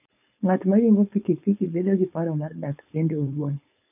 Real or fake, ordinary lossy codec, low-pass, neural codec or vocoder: fake; none; 3.6 kHz; codec, 16 kHz, 4.8 kbps, FACodec